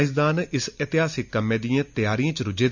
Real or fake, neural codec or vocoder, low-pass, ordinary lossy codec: real; none; 7.2 kHz; none